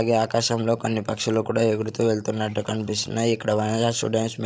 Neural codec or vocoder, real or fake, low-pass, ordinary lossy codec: codec, 16 kHz, 16 kbps, FreqCodec, larger model; fake; none; none